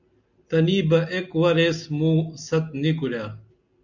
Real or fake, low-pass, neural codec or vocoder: real; 7.2 kHz; none